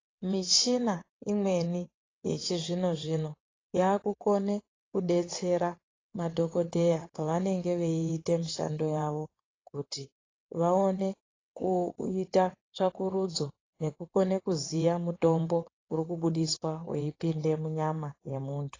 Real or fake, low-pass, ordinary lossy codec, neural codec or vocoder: fake; 7.2 kHz; AAC, 32 kbps; vocoder, 22.05 kHz, 80 mel bands, WaveNeXt